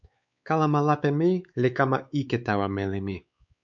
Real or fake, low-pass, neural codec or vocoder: fake; 7.2 kHz; codec, 16 kHz, 4 kbps, X-Codec, WavLM features, trained on Multilingual LibriSpeech